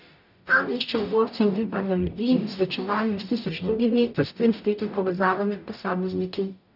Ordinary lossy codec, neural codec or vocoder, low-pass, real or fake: none; codec, 44.1 kHz, 0.9 kbps, DAC; 5.4 kHz; fake